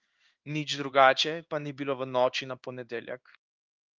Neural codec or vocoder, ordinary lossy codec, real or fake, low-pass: codec, 16 kHz in and 24 kHz out, 1 kbps, XY-Tokenizer; Opus, 24 kbps; fake; 7.2 kHz